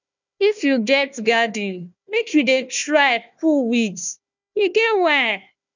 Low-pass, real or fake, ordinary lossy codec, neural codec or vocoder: 7.2 kHz; fake; none; codec, 16 kHz, 1 kbps, FunCodec, trained on Chinese and English, 50 frames a second